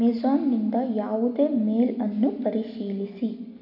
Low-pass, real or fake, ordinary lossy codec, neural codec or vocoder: 5.4 kHz; real; none; none